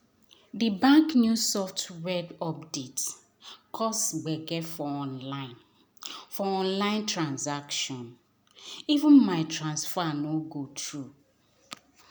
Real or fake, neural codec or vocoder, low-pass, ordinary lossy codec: real; none; none; none